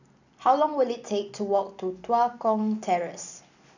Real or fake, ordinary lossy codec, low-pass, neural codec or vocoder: real; none; 7.2 kHz; none